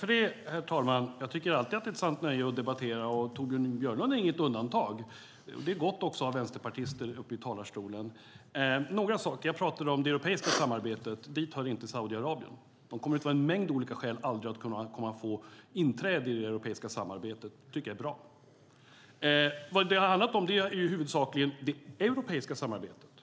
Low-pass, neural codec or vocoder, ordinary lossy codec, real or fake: none; none; none; real